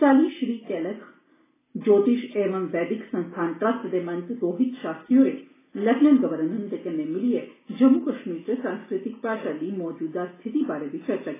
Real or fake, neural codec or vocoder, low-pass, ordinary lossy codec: real; none; 3.6 kHz; AAC, 16 kbps